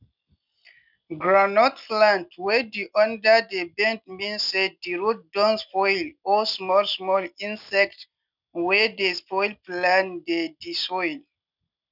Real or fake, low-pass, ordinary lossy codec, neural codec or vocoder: real; 5.4 kHz; none; none